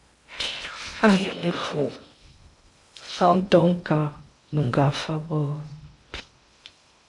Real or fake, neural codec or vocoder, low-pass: fake; codec, 16 kHz in and 24 kHz out, 0.6 kbps, FocalCodec, streaming, 2048 codes; 10.8 kHz